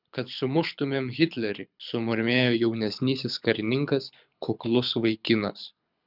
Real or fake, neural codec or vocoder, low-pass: fake; codec, 24 kHz, 6 kbps, HILCodec; 5.4 kHz